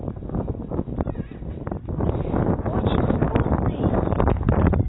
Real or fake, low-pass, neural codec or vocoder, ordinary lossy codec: real; 7.2 kHz; none; AAC, 16 kbps